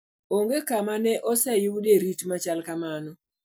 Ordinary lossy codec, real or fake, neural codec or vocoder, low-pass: none; real; none; none